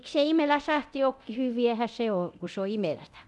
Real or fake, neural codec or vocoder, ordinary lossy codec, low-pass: fake; codec, 24 kHz, 0.9 kbps, DualCodec; none; none